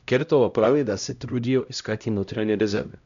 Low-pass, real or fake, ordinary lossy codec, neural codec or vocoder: 7.2 kHz; fake; none; codec, 16 kHz, 0.5 kbps, X-Codec, HuBERT features, trained on LibriSpeech